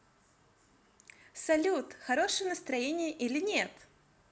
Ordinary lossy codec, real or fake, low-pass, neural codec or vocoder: none; real; none; none